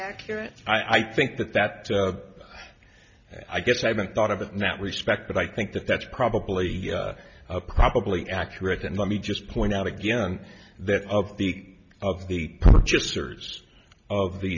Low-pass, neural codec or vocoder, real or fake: 7.2 kHz; none; real